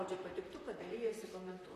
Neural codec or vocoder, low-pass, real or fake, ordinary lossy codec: vocoder, 44.1 kHz, 128 mel bands, Pupu-Vocoder; 14.4 kHz; fake; AAC, 48 kbps